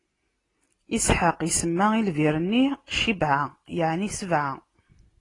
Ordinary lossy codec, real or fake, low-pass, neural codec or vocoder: AAC, 32 kbps; real; 10.8 kHz; none